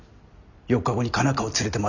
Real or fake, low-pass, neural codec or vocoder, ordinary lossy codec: real; 7.2 kHz; none; none